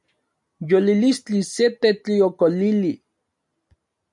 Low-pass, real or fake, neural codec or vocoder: 10.8 kHz; real; none